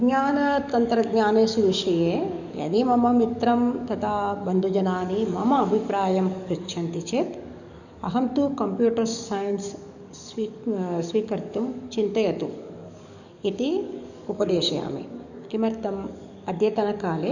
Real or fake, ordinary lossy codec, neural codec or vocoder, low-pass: fake; none; codec, 44.1 kHz, 7.8 kbps, DAC; 7.2 kHz